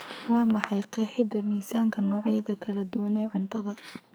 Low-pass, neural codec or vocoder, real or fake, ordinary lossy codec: none; codec, 44.1 kHz, 2.6 kbps, SNAC; fake; none